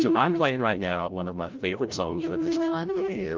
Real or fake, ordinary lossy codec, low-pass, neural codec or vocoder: fake; Opus, 32 kbps; 7.2 kHz; codec, 16 kHz, 0.5 kbps, FreqCodec, larger model